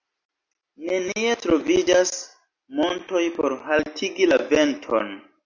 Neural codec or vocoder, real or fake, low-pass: none; real; 7.2 kHz